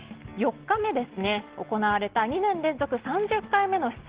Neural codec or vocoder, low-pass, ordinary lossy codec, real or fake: none; 3.6 kHz; Opus, 16 kbps; real